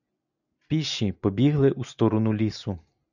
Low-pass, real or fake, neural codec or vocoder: 7.2 kHz; real; none